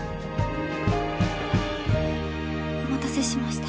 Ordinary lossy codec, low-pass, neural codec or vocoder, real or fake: none; none; none; real